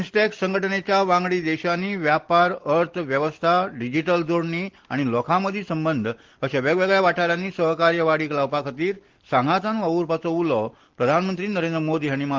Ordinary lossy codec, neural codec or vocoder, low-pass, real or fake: Opus, 16 kbps; none; 7.2 kHz; real